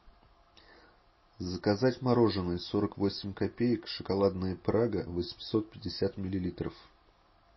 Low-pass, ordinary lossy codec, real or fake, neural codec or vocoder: 7.2 kHz; MP3, 24 kbps; real; none